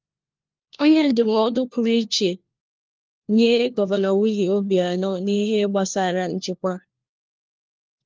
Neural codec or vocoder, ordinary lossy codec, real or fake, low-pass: codec, 16 kHz, 1 kbps, FunCodec, trained on LibriTTS, 50 frames a second; Opus, 24 kbps; fake; 7.2 kHz